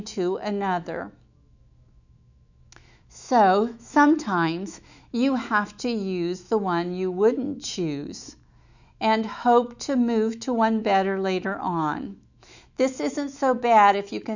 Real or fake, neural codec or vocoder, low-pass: fake; autoencoder, 48 kHz, 128 numbers a frame, DAC-VAE, trained on Japanese speech; 7.2 kHz